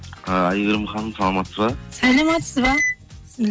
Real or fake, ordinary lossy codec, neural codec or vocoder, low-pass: real; none; none; none